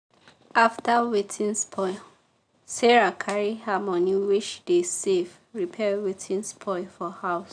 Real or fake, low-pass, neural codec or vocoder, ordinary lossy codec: real; 9.9 kHz; none; none